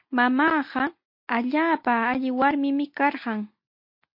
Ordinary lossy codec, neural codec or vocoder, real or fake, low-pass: MP3, 32 kbps; none; real; 5.4 kHz